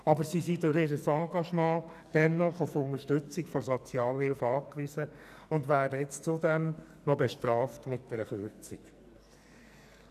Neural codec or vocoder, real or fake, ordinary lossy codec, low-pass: codec, 44.1 kHz, 2.6 kbps, SNAC; fake; none; 14.4 kHz